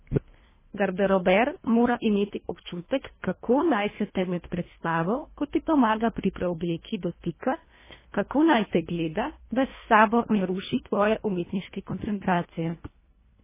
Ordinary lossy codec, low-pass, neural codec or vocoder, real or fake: MP3, 16 kbps; 3.6 kHz; codec, 24 kHz, 1.5 kbps, HILCodec; fake